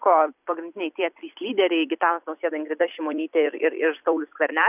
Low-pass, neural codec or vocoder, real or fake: 3.6 kHz; vocoder, 44.1 kHz, 128 mel bands every 256 samples, BigVGAN v2; fake